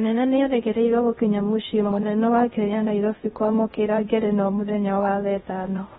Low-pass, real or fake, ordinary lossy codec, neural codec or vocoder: 10.8 kHz; fake; AAC, 16 kbps; codec, 16 kHz in and 24 kHz out, 0.6 kbps, FocalCodec, streaming, 2048 codes